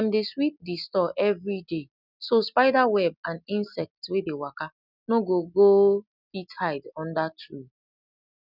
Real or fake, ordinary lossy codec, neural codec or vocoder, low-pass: real; none; none; 5.4 kHz